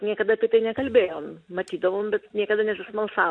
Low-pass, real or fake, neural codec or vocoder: 9.9 kHz; real; none